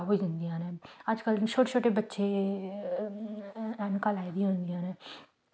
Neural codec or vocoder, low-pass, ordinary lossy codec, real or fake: none; none; none; real